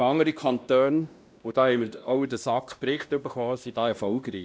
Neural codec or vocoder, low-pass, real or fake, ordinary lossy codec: codec, 16 kHz, 1 kbps, X-Codec, WavLM features, trained on Multilingual LibriSpeech; none; fake; none